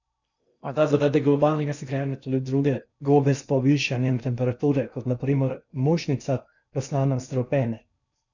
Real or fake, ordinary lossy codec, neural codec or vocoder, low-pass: fake; none; codec, 16 kHz in and 24 kHz out, 0.6 kbps, FocalCodec, streaming, 4096 codes; 7.2 kHz